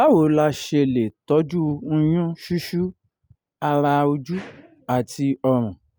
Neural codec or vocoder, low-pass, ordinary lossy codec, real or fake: none; none; none; real